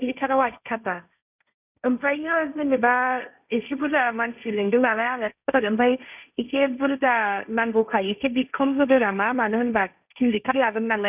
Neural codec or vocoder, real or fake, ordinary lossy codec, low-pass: codec, 16 kHz, 1.1 kbps, Voila-Tokenizer; fake; none; 3.6 kHz